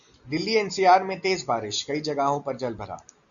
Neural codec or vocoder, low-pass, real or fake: none; 7.2 kHz; real